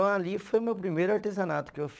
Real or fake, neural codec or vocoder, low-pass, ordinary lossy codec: fake; codec, 16 kHz, 16 kbps, FunCodec, trained on LibriTTS, 50 frames a second; none; none